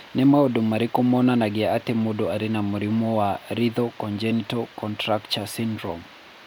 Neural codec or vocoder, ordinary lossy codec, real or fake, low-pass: none; none; real; none